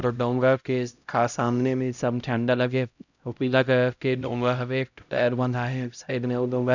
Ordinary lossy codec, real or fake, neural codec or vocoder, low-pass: none; fake; codec, 16 kHz, 0.5 kbps, X-Codec, HuBERT features, trained on LibriSpeech; 7.2 kHz